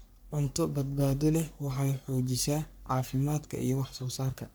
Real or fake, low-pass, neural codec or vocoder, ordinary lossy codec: fake; none; codec, 44.1 kHz, 3.4 kbps, Pupu-Codec; none